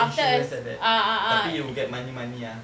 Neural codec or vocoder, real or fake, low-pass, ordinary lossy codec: none; real; none; none